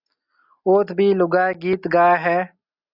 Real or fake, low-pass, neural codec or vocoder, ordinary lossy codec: real; 5.4 kHz; none; Opus, 64 kbps